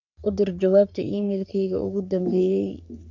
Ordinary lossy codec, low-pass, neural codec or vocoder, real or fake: none; 7.2 kHz; codec, 44.1 kHz, 3.4 kbps, Pupu-Codec; fake